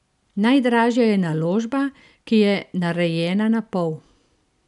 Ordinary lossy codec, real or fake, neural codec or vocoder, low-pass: none; real; none; 10.8 kHz